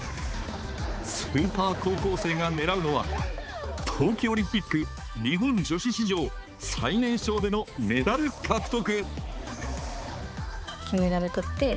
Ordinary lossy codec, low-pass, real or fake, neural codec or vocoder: none; none; fake; codec, 16 kHz, 4 kbps, X-Codec, HuBERT features, trained on balanced general audio